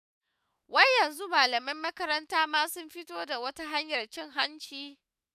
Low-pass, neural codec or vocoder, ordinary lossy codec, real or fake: 14.4 kHz; autoencoder, 48 kHz, 128 numbers a frame, DAC-VAE, trained on Japanese speech; none; fake